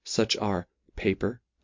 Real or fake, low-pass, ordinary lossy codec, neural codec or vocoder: real; 7.2 kHz; MP3, 48 kbps; none